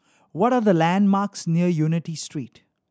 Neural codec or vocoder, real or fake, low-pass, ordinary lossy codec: none; real; none; none